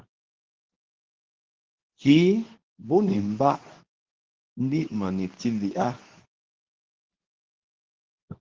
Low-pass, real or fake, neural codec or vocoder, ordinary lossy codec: 7.2 kHz; fake; codec, 24 kHz, 0.9 kbps, WavTokenizer, medium speech release version 2; Opus, 16 kbps